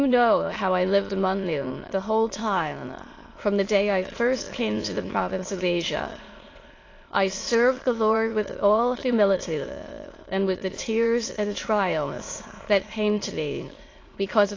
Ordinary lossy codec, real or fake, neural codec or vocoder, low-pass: AAC, 32 kbps; fake; autoencoder, 22.05 kHz, a latent of 192 numbers a frame, VITS, trained on many speakers; 7.2 kHz